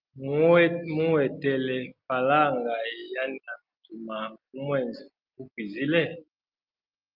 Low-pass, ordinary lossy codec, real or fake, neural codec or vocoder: 5.4 kHz; Opus, 24 kbps; real; none